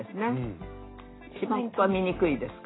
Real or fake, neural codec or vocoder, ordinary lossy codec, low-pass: real; none; AAC, 16 kbps; 7.2 kHz